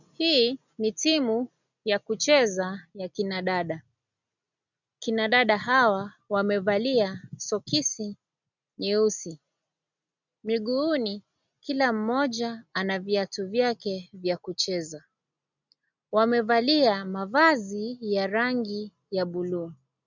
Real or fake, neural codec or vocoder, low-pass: real; none; 7.2 kHz